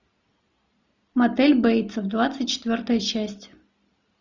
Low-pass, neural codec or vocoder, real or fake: 7.2 kHz; none; real